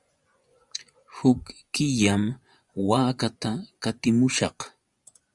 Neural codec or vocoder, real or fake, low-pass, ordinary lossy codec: vocoder, 24 kHz, 100 mel bands, Vocos; fake; 10.8 kHz; Opus, 64 kbps